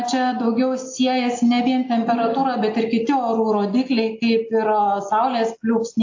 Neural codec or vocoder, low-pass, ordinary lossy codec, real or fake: none; 7.2 kHz; AAC, 48 kbps; real